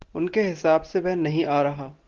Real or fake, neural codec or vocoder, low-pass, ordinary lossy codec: real; none; 7.2 kHz; Opus, 16 kbps